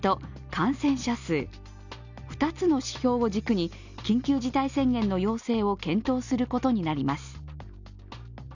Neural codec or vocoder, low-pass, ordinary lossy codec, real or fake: none; 7.2 kHz; none; real